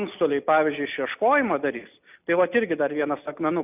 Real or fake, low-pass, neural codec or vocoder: real; 3.6 kHz; none